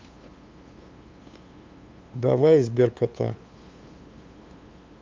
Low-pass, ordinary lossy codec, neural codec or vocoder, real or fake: 7.2 kHz; Opus, 24 kbps; codec, 16 kHz, 2 kbps, FunCodec, trained on LibriTTS, 25 frames a second; fake